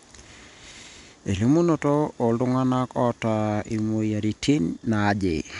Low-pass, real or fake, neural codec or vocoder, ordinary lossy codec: 10.8 kHz; real; none; MP3, 96 kbps